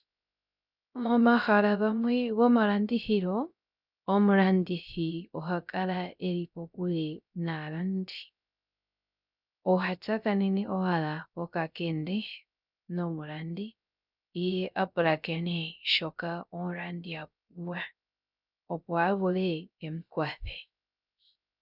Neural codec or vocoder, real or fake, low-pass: codec, 16 kHz, 0.3 kbps, FocalCodec; fake; 5.4 kHz